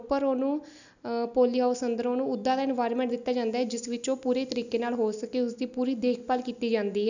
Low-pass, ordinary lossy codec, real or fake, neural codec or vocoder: 7.2 kHz; none; real; none